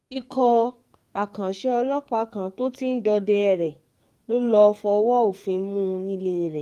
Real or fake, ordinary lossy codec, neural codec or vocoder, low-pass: fake; Opus, 32 kbps; codec, 44.1 kHz, 2.6 kbps, SNAC; 14.4 kHz